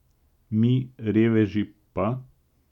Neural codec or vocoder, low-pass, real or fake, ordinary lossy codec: none; 19.8 kHz; real; none